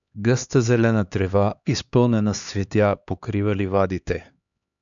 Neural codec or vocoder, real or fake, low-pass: codec, 16 kHz, 2 kbps, X-Codec, HuBERT features, trained on LibriSpeech; fake; 7.2 kHz